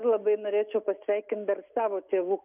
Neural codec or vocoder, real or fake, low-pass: none; real; 3.6 kHz